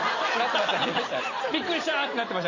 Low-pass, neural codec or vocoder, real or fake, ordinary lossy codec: 7.2 kHz; none; real; MP3, 32 kbps